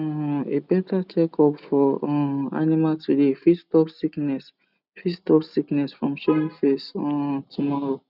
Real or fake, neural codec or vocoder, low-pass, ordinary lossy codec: real; none; 5.4 kHz; none